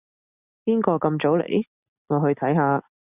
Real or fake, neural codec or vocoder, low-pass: real; none; 3.6 kHz